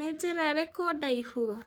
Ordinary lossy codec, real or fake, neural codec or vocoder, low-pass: none; fake; codec, 44.1 kHz, 3.4 kbps, Pupu-Codec; none